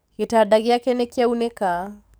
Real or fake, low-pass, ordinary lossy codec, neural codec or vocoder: fake; none; none; codec, 44.1 kHz, 7.8 kbps, DAC